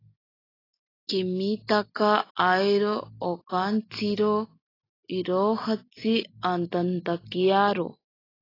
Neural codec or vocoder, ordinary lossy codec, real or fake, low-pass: none; AAC, 24 kbps; real; 5.4 kHz